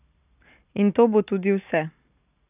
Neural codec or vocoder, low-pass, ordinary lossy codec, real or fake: none; 3.6 kHz; none; real